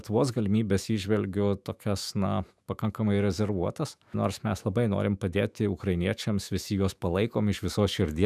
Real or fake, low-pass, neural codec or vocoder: fake; 14.4 kHz; autoencoder, 48 kHz, 128 numbers a frame, DAC-VAE, trained on Japanese speech